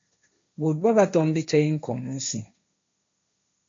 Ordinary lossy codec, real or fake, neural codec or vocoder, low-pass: MP3, 48 kbps; fake; codec, 16 kHz, 1.1 kbps, Voila-Tokenizer; 7.2 kHz